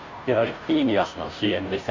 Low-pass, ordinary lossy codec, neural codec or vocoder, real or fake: 7.2 kHz; MP3, 64 kbps; codec, 16 kHz, 0.5 kbps, FunCodec, trained on Chinese and English, 25 frames a second; fake